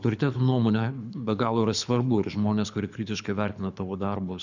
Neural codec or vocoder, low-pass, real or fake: codec, 24 kHz, 6 kbps, HILCodec; 7.2 kHz; fake